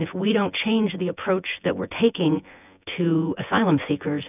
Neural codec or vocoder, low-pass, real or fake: vocoder, 24 kHz, 100 mel bands, Vocos; 3.6 kHz; fake